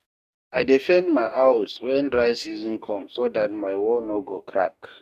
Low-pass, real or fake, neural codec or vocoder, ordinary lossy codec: 14.4 kHz; fake; codec, 44.1 kHz, 2.6 kbps, DAC; none